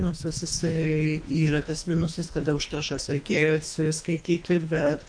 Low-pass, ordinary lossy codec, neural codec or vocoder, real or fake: 9.9 kHz; AAC, 64 kbps; codec, 24 kHz, 1.5 kbps, HILCodec; fake